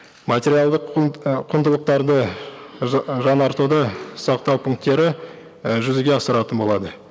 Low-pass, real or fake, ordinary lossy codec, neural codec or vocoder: none; real; none; none